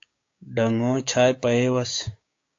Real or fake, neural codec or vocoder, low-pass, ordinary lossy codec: fake; codec, 16 kHz, 6 kbps, DAC; 7.2 kHz; AAC, 48 kbps